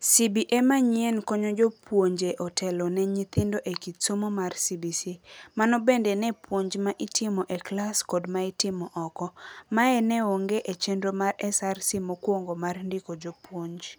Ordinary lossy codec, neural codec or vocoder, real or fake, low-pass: none; none; real; none